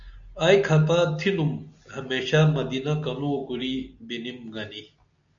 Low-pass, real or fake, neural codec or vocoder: 7.2 kHz; real; none